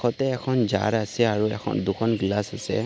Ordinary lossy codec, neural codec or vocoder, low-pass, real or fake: none; none; none; real